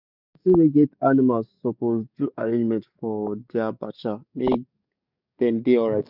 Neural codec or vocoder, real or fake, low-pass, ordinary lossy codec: codec, 44.1 kHz, 7.8 kbps, DAC; fake; 5.4 kHz; none